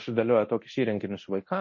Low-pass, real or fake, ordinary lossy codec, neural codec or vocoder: 7.2 kHz; fake; MP3, 32 kbps; codec, 24 kHz, 0.9 kbps, DualCodec